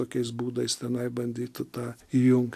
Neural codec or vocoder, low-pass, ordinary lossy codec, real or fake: none; 14.4 kHz; AAC, 64 kbps; real